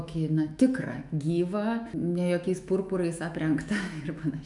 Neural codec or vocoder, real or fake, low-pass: autoencoder, 48 kHz, 128 numbers a frame, DAC-VAE, trained on Japanese speech; fake; 10.8 kHz